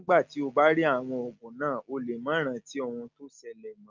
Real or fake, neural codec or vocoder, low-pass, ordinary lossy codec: real; none; 7.2 kHz; Opus, 32 kbps